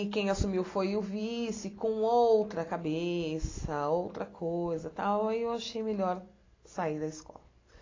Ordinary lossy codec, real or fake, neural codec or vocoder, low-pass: AAC, 32 kbps; real; none; 7.2 kHz